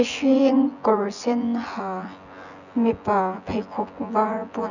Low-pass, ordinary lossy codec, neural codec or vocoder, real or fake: 7.2 kHz; none; vocoder, 24 kHz, 100 mel bands, Vocos; fake